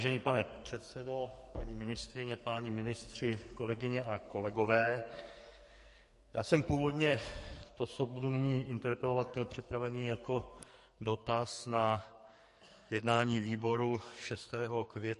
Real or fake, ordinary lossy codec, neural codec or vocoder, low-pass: fake; MP3, 48 kbps; codec, 44.1 kHz, 2.6 kbps, SNAC; 14.4 kHz